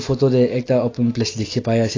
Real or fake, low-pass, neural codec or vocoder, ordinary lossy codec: real; 7.2 kHz; none; AAC, 32 kbps